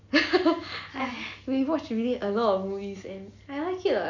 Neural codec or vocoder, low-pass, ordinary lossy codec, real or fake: none; 7.2 kHz; none; real